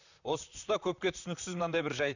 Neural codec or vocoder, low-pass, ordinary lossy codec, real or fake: vocoder, 44.1 kHz, 128 mel bands, Pupu-Vocoder; 7.2 kHz; none; fake